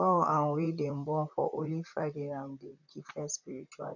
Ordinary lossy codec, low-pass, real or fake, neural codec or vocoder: none; 7.2 kHz; fake; vocoder, 44.1 kHz, 128 mel bands, Pupu-Vocoder